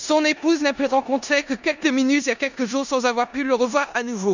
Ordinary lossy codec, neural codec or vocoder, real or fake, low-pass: none; codec, 16 kHz in and 24 kHz out, 0.9 kbps, LongCat-Audio-Codec, four codebook decoder; fake; 7.2 kHz